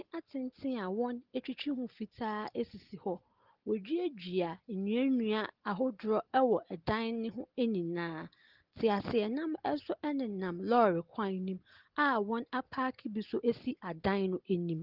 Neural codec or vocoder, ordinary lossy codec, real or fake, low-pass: none; Opus, 16 kbps; real; 5.4 kHz